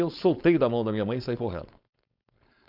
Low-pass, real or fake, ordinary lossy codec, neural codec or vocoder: 5.4 kHz; fake; none; codec, 16 kHz, 4.8 kbps, FACodec